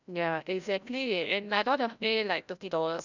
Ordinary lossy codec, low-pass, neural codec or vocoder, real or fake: none; 7.2 kHz; codec, 16 kHz, 0.5 kbps, FreqCodec, larger model; fake